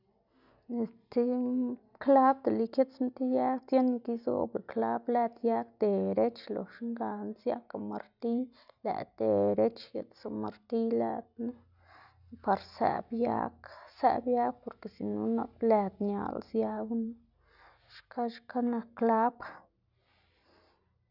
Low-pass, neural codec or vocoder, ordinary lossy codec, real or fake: 5.4 kHz; none; none; real